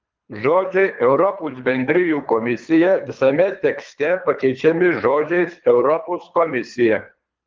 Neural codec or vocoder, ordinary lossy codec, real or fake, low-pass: codec, 24 kHz, 3 kbps, HILCodec; Opus, 24 kbps; fake; 7.2 kHz